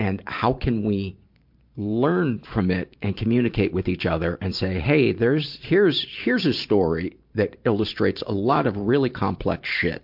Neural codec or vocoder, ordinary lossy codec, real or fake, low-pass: vocoder, 44.1 kHz, 128 mel bands every 512 samples, BigVGAN v2; MP3, 48 kbps; fake; 5.4 kHz